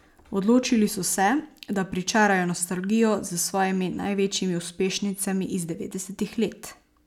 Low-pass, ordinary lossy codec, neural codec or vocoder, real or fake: 19.8 kHz; none; none; real